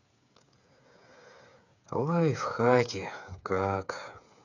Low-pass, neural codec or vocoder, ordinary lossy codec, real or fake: 7.2 kHz; codec, 16 kHz, 8 kbps, FreqCodec, smaller model; none; fake